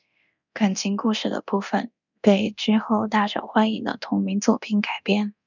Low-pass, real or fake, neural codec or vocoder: 7.2 kHz; fake; codec, 24 kHz, 0.9 kbps, DualCodec